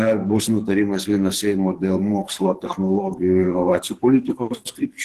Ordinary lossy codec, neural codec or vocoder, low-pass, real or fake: Opus, 16 kbps; codec, 44.1 kHz, 2.6 kbps, SNAC; 14.4 kHz; fake